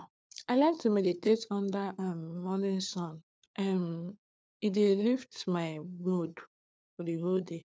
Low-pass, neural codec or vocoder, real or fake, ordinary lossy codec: none; codec, 16 kHz, 4 kbps, FunCodec, trained on LibriTTS, 50 frames a second; fake; none